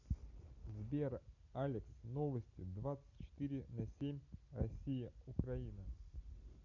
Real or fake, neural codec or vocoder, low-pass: real; none; 7.2 kHz